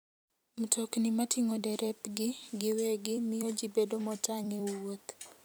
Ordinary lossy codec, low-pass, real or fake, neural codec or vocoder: none; none; fake; vocoder, 44.1 kHz, 128 mel bands every 256 samples, BigVGAN v2